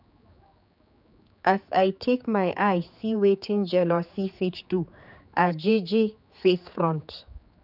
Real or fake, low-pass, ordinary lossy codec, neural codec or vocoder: fake; 5.4 kHz; none; codec, 16 kHz, 4 kbps, X-Codec, HuBERT features, trained on general audio